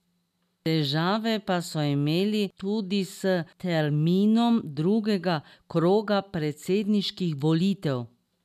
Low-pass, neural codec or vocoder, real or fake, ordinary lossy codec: 14.4 kHz; none; real; none